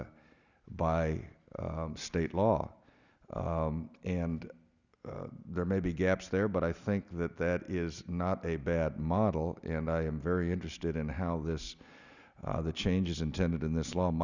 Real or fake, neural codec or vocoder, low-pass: real; none; 7.2 kHz